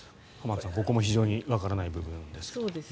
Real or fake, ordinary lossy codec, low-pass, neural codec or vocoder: real; none; none; none